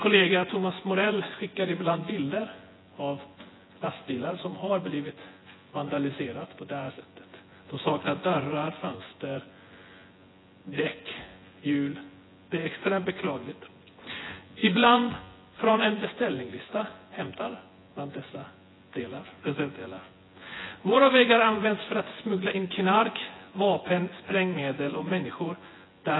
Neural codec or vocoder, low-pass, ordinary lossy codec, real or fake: vocoder, 24 kHz, 100 mel bands, Vocos; 7.2 kHz; AAC, 16 kbps; fake